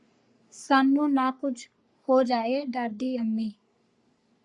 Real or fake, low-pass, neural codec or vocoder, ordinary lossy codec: fake; 10.8 kHz; codec, 44.1 kHz, 3.4 kbps, Pupu-Codec; Opus, 64 kbps